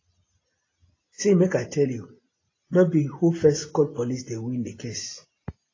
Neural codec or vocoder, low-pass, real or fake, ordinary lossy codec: none; 7.2 kHz; real; AAC, 32 kbps